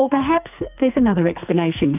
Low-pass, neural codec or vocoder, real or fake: 3.6 kHz; codec, 44.1 kHz, 2.6 kbps, SNAC; fake